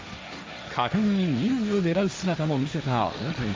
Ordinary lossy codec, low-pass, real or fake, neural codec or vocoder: none; none; fake; codec, 16 kHz, 1.1 kbps, Voila-Tokenizer